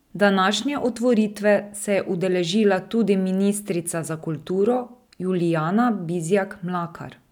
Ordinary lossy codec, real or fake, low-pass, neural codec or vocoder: none; real; 19.8 kHz; none